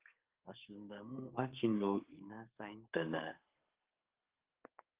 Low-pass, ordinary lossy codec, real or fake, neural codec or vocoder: 3.6 kHz; Opus, 24 kbps; fake; codec, 32 kHz, 1.9 kbps, SNAC